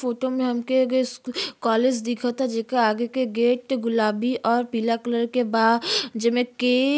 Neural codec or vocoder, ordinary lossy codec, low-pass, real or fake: none; none; none; real